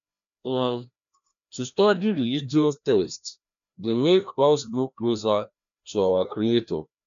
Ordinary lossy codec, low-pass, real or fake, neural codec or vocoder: none; 7.2 kHz; fake; codec, 16 kHz, 1 kbps, FreqCodec, larger model